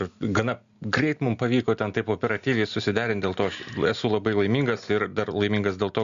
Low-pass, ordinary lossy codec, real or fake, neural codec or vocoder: 7.2 kHz; Opus, 64 kbps; real; none